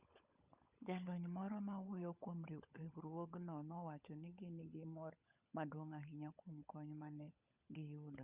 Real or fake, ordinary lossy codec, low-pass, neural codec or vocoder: fake; Opus, 64 kbps; 3.6 kHz; codec, 16 kHz, 16 kbps, FunCodec, trained on LibriTTS, 50 frames a second